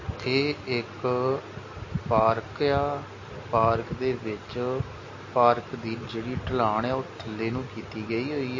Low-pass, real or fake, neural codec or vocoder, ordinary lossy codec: 7.2 kHz; real; none; MP3, 32 kbps